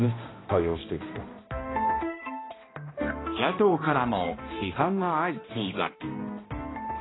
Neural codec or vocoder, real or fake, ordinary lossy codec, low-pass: codec, 16 kHz, 1 kbps, X-Codec, HuBERT features, trained on balanced general audio; fake; AAC, 16 kbps; 7.2 kHz